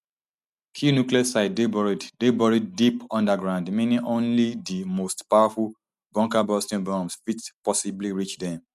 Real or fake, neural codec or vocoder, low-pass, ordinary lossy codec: real; none; 14.4 kHz; none